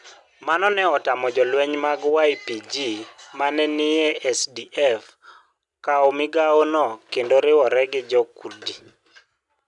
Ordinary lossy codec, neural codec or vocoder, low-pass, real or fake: none; none; 10.8 kHz; real